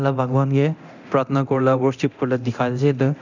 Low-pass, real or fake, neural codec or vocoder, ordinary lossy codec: 7.2 kHz; fake; codec, 24 kHz, 0.9 kbps, DualCodec; none